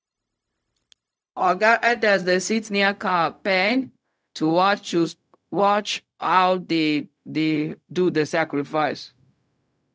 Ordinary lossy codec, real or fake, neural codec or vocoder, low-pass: none; fake; codec, 16 kHz, 0.4 kbps, LongCat-Audio-Codec; none